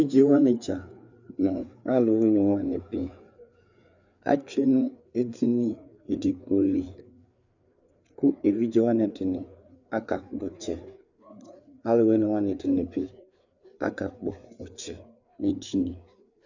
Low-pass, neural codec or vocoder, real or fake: 7.2 kHz; codec, 16 kHz, 4 kbps, FreqCodec, larger model; fake